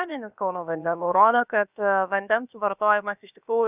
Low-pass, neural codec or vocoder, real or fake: 3.6 kHz; codec, 16 kHz, about 1 kbps, DyCAST, with the encoder's durations; fake